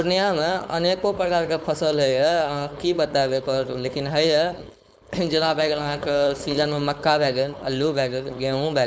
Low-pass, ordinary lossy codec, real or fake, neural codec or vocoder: none; none; fake; codec, 16 kHz, 4.8 kbps, FACodec